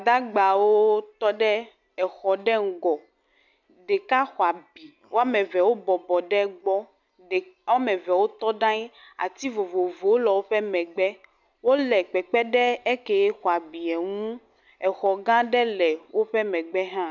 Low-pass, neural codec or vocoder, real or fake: 7.2 kHz; none; real